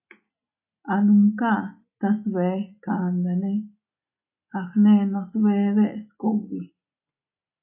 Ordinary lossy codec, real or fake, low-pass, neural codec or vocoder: AAC, 32 kbps; real; 3.6 kHz; none